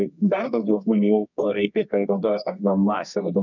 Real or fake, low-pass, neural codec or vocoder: fake; 7.2 kHz; codec, 24 kHz, 0.9 kbps, WavTokenizer, medium music audio release